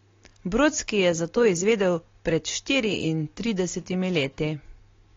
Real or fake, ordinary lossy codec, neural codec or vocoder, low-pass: real; AAC, 32 kbps; none; 7.2 kHz